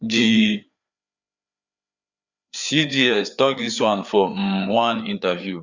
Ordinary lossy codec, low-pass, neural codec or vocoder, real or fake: Opus, 64 kbps; 7.2 kHz; codec, 16 kHz, 4 kbps, FreqCodec, larger model; fake